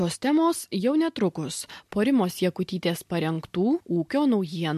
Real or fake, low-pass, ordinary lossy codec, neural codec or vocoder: real; 14.4 kHz; MP3, 64 kbps; none